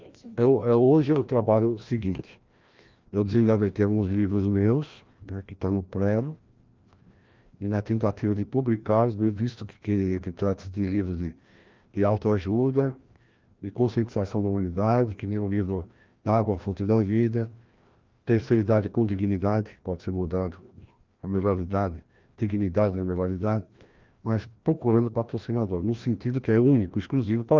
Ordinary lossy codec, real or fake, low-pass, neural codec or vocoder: Opus, 32 kbps; fake; 7.2 kHz; codec, 16 kHz, 1 kbps, FreqCodec, larger model